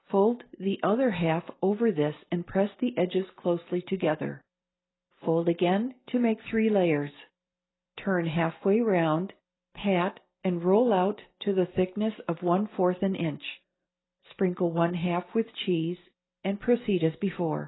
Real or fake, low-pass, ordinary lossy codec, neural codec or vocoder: real; 7.2 kHz; AAC, 16 kbps; none